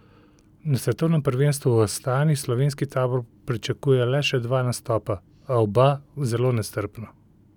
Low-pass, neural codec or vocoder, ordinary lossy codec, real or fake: 19.8 kHz; none; none; real